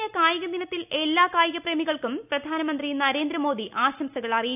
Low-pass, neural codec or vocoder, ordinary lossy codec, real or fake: 3.6 kHz; none; none; real